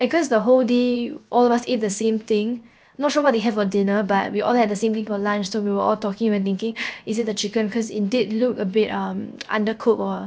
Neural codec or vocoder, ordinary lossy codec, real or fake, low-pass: codec, 16 kHz, 0.7 kbps, FocalCodec; none; fake; none